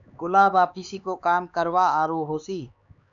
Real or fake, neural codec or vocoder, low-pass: fake; codec, 16 kHz, 4 kbps, X-Codec, HuBERT features, trained on LibriSpeech; 7.2 kHz